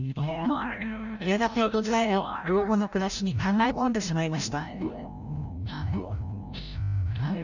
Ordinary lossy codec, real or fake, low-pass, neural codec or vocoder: none; fake; 7.2 kHz; codec, 16 kHz, 0.5 kbps, FreqCodec, larger model